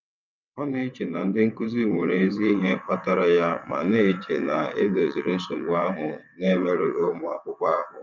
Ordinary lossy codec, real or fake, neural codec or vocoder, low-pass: none; fake; vocoder, 22.05 kHz, 80 mel bands, WaveNeXt; 7.2 kHz